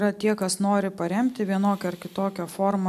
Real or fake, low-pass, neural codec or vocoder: real; 14.4 kHz; none